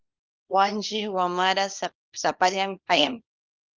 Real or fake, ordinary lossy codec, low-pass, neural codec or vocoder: fake; Opus, 24 kbps; 7.2 kHz; codec, 24 kHz, 0.9 kbps, WavTokenizer, small release